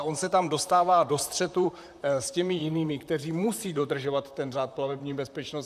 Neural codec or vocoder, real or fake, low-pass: vocoder, 44.1 kHz, 128 mel bands, Pupu-Vocoder; fake; 14.4 kHz